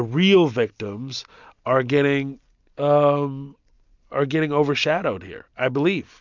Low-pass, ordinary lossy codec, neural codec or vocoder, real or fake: 7.2 kHz; MP3, 64 kbps; none; real